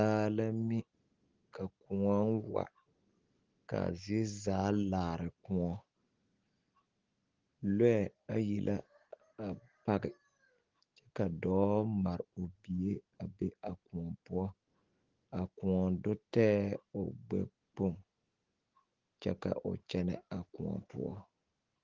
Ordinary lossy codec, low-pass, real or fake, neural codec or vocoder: Opus, 16 kbps; 7.2 kHz; real; none